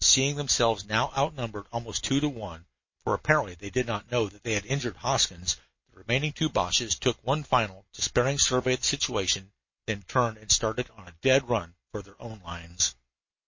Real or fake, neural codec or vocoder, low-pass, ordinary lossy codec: real; none; 7.2 kHz; MP3, 32 kbps